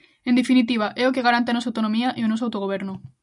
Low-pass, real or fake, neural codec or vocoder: 10.8 kHz; real; none